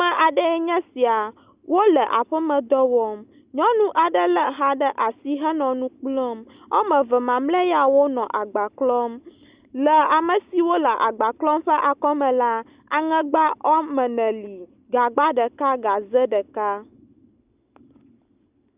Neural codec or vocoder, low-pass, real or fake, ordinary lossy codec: none; 3.6 kHz; real; Opus, 64 kbps